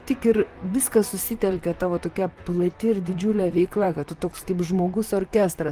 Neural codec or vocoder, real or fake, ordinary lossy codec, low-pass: vocoder, 44.1 kHz, 128 mel bands, Pupu-Vocoder; fake; Opus, 24 kbps; 14.4 kHz